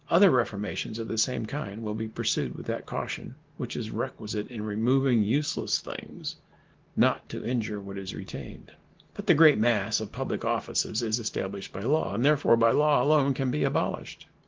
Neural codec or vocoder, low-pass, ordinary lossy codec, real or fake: none; 7.2 kHz; Opus, 16 kbps; real